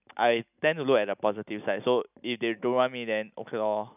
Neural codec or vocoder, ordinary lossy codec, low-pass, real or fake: none; none; 3.6 kHz; real